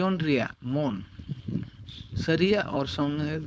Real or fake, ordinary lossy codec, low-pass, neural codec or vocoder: fake; none; none; codec, 16 kHz, 4.8 kbps, FACodec